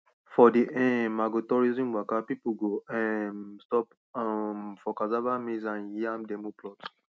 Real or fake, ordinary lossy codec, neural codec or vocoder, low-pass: real; none; none; none